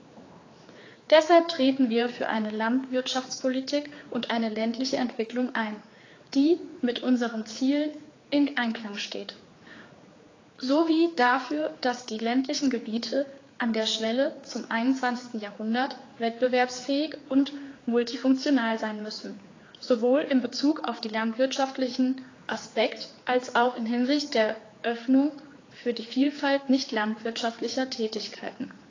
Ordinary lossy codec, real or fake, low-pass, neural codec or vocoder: AAC, 32 kbps; fake; 7.2 kHz; codec, 16 kHz, 4 kbps, X-Codec, HuBERT features, trained on general audio